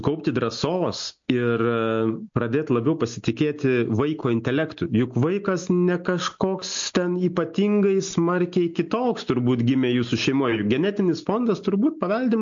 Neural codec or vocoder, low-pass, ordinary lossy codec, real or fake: none; 7.2 kHz; MP3, 48 kbps; real